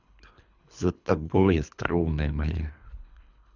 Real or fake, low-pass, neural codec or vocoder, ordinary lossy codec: fake; 7.2 kHz; codec, 24 kHz, 3 kbps, HILCodec; none